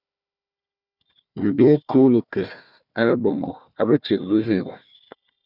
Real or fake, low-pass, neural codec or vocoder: fake; 5.4 kHz; codec, 16 kHz, 1 kbps, FunCodec, trained on Chinese and English, 50 frames a second